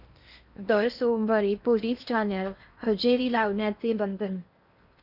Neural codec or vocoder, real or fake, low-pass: codec, 16 kHz in and 24 kHz out, 0.8 kbps, FocalCodec, streaming, 65536 codes; fake; 5.4 kHz